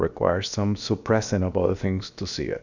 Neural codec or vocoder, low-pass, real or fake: codec, 16 kHz, about 1 kbps, DyCAST, with the encoder's durations; 7.2 kHz; fake